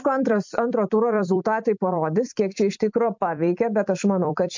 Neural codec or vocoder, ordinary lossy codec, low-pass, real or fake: vocoder, 44.1 kHz, 128 mel bands every 256 samples, BigVGAN v2; MP3, 64 kbps; 7.2 kHz; fake